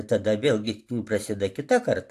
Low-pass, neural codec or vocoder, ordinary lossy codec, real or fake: 14.4 kHz; none; AAC, 64 kbps; real